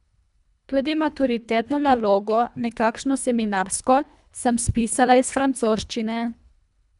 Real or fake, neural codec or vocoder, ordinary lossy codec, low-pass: fake; codec, 24 kHz, 1.5 kbps, HILCodec; none; 10.8 kHz